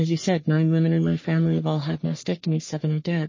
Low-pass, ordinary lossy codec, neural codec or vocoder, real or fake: 7.2 kHz; MP3, 32 kbps; codec, 44.1 kHz, 3.4 kbps, Pupu-Codec; fake